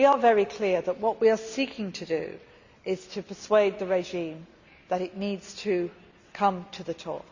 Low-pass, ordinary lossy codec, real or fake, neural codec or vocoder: 7.2 kHz; Opus, 64 kbps; real; none